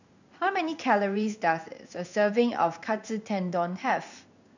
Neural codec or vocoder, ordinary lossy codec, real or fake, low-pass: codec, 16 kHz in and 24 kHz out, 1 kbps, XY-Tokenizer; none; fake; 7.2 kHz